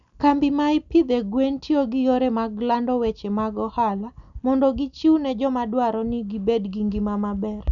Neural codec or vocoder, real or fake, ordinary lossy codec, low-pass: none; real; none; 7.2 kHz